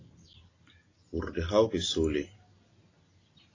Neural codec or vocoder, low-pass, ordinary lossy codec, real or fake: none; 7.2 kHz; AAC, 32 kbps; real